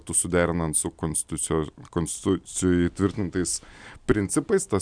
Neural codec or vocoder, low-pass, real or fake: none; 9.9 kHz; real